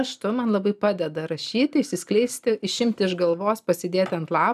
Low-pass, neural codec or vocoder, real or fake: 14.4 kHz; none; real